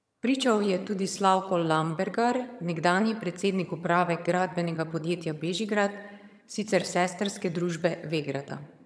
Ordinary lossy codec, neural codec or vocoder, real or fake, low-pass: none; vocoder, 22.05 kHz, 80 mel bands, HiFi-GAN; fake; none